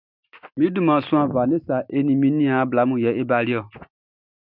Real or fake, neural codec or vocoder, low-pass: real; none; 5.4 kHz